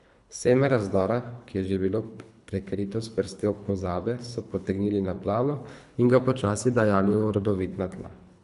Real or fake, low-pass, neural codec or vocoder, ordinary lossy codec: fake; 10.8 kHz; codec, 24 kHz, 3 kbps, HILCodec; none